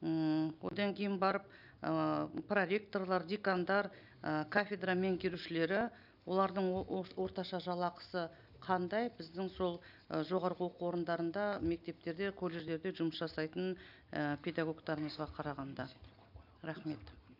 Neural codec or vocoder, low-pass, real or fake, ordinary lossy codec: none; 5.4 kHz; real; none